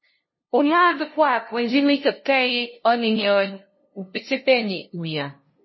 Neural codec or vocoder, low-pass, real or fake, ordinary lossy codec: codec, 16 kHz, 0.5 kbps, FunCodec, trained on LibriTTS, 25 frames a second; 7.2 kHz; fake; MP3, 24 kbps